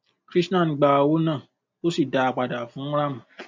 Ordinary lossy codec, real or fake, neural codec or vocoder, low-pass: MP3, 48 kbps; real; none; 7.2 kHz